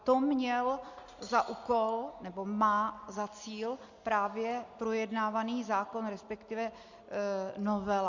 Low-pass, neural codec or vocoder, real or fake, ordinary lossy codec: 7.2 kHz; none; real; Opus, 64 kbps